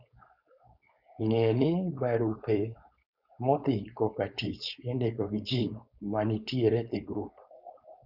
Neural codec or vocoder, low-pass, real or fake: codec, 16 kHz, 4.8 kbps, FACodec; 5.4 kHz; fake